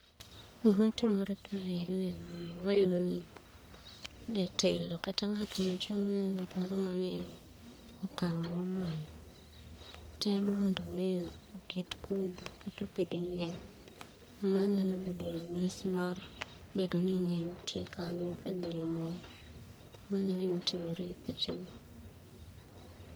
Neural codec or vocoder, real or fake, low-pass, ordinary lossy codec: codec, 44.1 kHz, 1.7 kbps, Pupu-Codec; fake; none; none